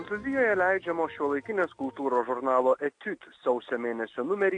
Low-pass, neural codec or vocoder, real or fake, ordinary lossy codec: 9.9 kHz; none; real; AAC, 48 kbps